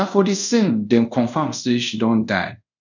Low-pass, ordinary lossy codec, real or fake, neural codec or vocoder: 7.2 kHz; none; fake; codec, 24 kHz, 0.5 kbps, DualCodec